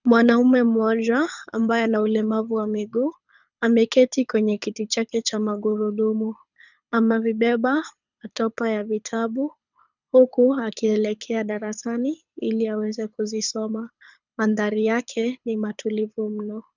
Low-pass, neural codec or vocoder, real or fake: 7.2 kHz; codec, 24 kHz, 6 kbps, HILCodec; fake